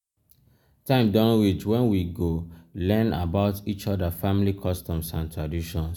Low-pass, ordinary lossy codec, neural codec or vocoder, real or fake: 19.8 kHz; none; none; real